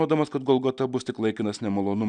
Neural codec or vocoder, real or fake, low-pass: none; real; 9.9 kHz